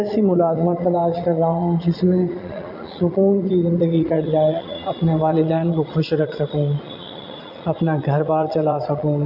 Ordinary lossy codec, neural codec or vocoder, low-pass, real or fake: AAC, 48 kbps; vocoder, 22.05 kHz, 80 mel bands, Vocos; 5.4 kHz; fake